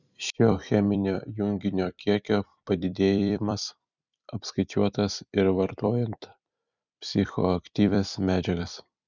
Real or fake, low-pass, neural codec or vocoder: fake; 7.2 kHz; vocoder, 44.1 kHz, 128 mel bands every 256 samples, BigVGAN v2